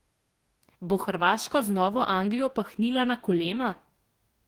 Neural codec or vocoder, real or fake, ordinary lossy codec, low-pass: codec, 44.1 kHz, 2.6 kbps, DAC; fake; Opus, 24 kbps; 19.8 kHz